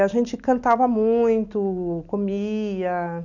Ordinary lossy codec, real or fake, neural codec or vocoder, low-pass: none; real; none; 7.2 kHz